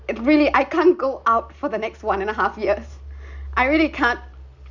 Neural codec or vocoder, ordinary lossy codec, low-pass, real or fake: none; none; 7.2 kHz; real